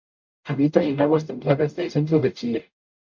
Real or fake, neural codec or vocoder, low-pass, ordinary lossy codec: fake; codec, 44.1 kHz, 0.9 kbps, DAC; 7.2 kHz; MP3, 48 kbps